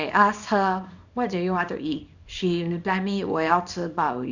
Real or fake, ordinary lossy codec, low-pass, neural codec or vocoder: fake; none; 7.2 kHz; codec, 24 kHz, 0.9 kbps, WavTokenizer, small release